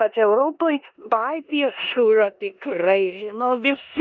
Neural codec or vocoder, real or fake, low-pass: codec, 16 kHz in and 24 kHz out, 0.9 kbps, LongCat-Audio-Codec, four codebook decoder; fake; 7.2 kHz